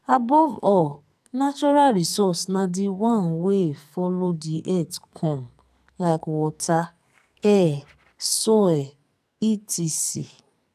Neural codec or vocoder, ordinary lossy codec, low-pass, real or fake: codec, 44.1 kHz, 2.6 kbps, SNAC; none; 14.4 kHz; fake